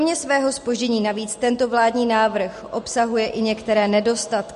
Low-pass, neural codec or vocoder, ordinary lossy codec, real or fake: 14.4 kHz; none; MP3, 48 kbps; real